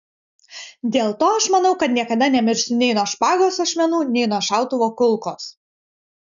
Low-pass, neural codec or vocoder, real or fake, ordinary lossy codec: 7.2 kHz; none; real; MP3, 96 kbps